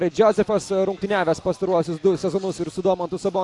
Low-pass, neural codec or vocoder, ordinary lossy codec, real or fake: 10.8 kHz; none; AAC, 64 kbps; real